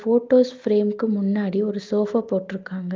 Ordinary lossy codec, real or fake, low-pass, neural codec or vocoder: Opus, 32 kbps; real; 7.2 kHz; none